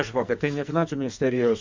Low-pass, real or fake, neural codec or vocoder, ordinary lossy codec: 7.2 kHz; fake; codec, 44.1 kHz, 2.6 kbps, SNAC; MP3, 64 kbps